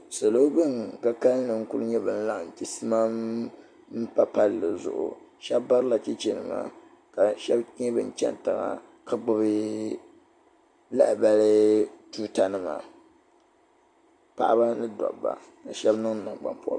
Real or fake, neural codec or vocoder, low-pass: real; none; 9.9 kHz